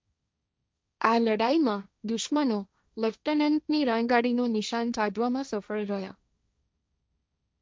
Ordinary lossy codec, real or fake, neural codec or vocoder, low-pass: none; fake; codec, 16 kHz, 1.1 kbps, Voila-Tokenizer; none